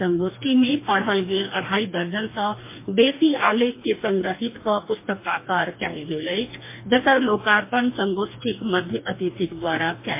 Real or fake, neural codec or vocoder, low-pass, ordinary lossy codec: fake; codec, 44.1 kHz, 2.6 kbps, DAC; 3.6 kHz; MP3, 24 kbps